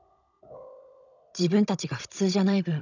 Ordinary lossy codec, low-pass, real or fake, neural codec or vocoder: none; 7.2 kHz; fake; codec, 16 kHz, 16 kbps, FunCodec, trained on Chinese and English, 50 frames a second